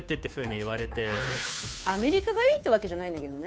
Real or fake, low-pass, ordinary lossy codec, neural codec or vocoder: fake; none; none; codec, 16 kHz, 2 kbps, FunCodec, trained on Chinese and English, 25 frames a second